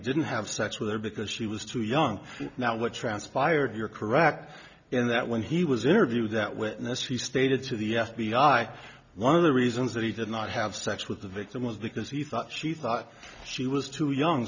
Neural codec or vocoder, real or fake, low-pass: none; real; 7.2 kHz